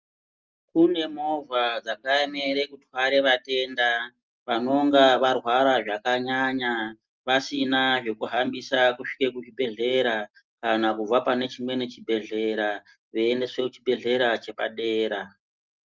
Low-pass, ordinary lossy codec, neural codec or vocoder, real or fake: 7.2 kHz; Opus, 24 kbps; none; real